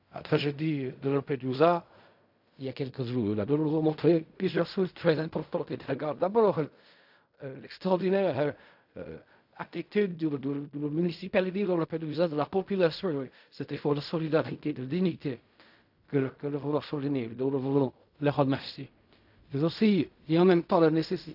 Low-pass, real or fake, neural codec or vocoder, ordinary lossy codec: 5.4 kHz; fake; codec, 16 kHz in and 24 kHz out, 0.4 kbps, LongCat-Audio-Codec, fine tuned four codebook decoder; AAC, 48 kbps